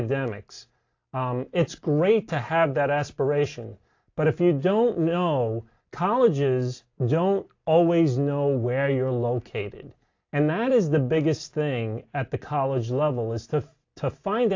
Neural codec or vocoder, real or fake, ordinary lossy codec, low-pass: none; real; AAC, 48 kbps; 7.2 kHz